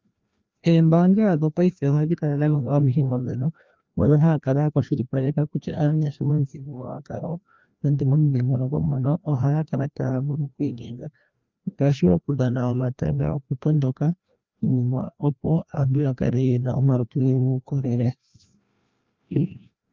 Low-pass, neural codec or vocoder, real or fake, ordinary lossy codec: 7.2 kHz; codec, 16 kHz, 1 kbps, FreqCodec, larger model; fake; Opus, 24 kbps